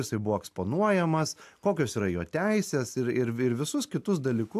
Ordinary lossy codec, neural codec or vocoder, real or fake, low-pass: AAC, 96 kbps; none; real; 14.4 kHz